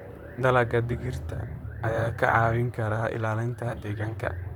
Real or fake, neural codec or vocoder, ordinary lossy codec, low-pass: fake; vocoder, 44.1 kHz, 128 mel bands, Pupu-Vocoder; none; 19.8 kHz